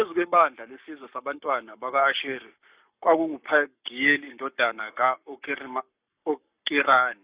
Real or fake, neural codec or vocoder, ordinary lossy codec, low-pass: fake; codec, 44.1 kHz, 7.8 kbps, Pupu-Codec; Opus, 64 kbps; 3.6 kHz